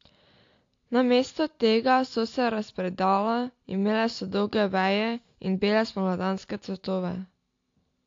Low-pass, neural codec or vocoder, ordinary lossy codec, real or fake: 7.2 kHz; none; AAC, 48 kbps; real